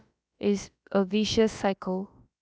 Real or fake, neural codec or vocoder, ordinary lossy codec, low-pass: fake; codec, 16 kHz, about 1 kbps, DyCAST, with the encoder's durations; none; none